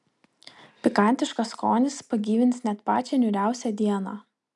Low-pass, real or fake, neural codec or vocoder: 10.8 kHz; real; none